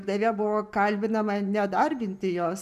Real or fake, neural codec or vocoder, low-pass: real; none; 14.4 kHz